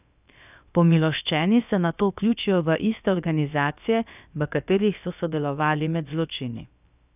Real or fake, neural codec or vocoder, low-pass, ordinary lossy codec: fake; codec, 16 kHz, about 1 kbps, DyCAST, with the encoder's durations; 3.6 kHz; none